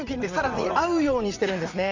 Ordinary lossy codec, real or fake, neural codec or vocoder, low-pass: Opus, 64 kbps; fake; vocoder, 44.1 kHz, 80 mel bands, Vocos; 7.2 kHz